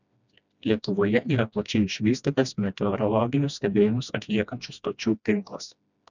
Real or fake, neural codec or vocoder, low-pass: fake; codec, 16 kHz, 1 kbps, FreqCodec, smaller model; 7.2 kHz